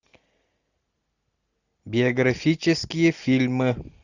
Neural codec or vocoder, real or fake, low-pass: none; real; 7.2 kHz